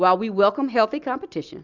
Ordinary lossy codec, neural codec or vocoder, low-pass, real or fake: Opus, 64 kbps; none; 7.2 kHz; real